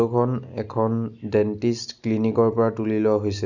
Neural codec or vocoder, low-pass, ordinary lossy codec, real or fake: none; 7.2 kHz; none; real